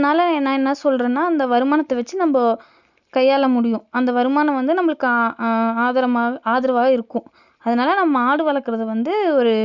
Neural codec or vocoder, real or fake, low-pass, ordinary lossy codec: none; real; 7.2 kHz; Opus, 64 kbps